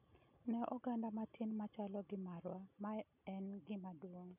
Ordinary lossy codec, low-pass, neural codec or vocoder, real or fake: none; 3.6 kHz; none; real